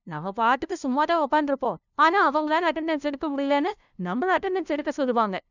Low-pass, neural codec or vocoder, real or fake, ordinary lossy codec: 7.2 kHz; codec, 16 kHz, 0.5 kbps, FunCodec, trained on LibriTTS, 25 frames a second; fake; none